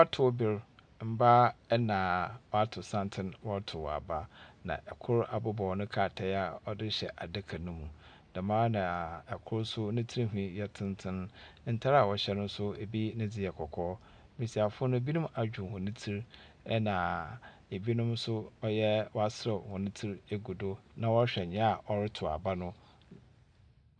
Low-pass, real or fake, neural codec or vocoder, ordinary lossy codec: 9.9 kHz; real; none; MP3, 96 kbps